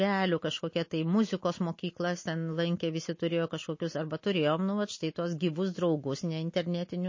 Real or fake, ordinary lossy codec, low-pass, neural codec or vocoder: real; MP3, 32 kbps; 7.2 kHz; none